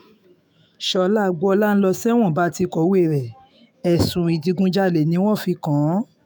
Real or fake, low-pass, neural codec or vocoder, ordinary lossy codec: fake; none; autoencoder, 48 kHz, 128 numbers a frame, DAC-VAE, trained on Japanese speech; none